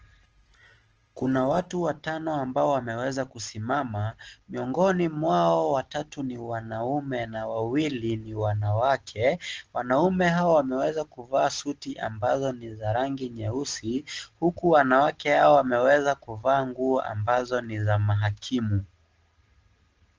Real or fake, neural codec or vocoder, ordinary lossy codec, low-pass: real; none; Opus, 16 kbps; 7.2 kHz